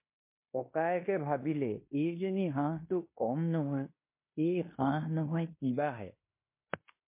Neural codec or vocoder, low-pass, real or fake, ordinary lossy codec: codec, 16 kHz in and 24 kHz out, 0.9 kbps, LongCat-Audio-Codec, fine tuned four codebook decoder; 3.6 kHz; fake; MP3, 24 kbps